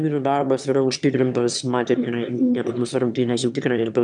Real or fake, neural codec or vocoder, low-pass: fake; autoencoder, 22.05 kHz, a latent of 192 numbers a frame, VITS, trained on one speaker; 9.9 kHz